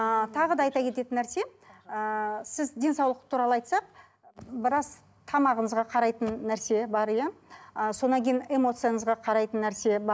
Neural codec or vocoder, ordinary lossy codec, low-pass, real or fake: none; none; none; real